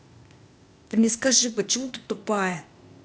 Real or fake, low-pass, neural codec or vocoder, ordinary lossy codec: fake; none; codec, 16 kHz, 0.8 kbps, ZipCodec; none